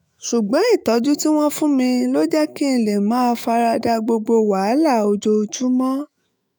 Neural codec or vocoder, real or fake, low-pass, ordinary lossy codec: autoencoder, 48 kHz, 128 numbers a frame, DAC-VAE, trained on Japanese speech; fake; none; none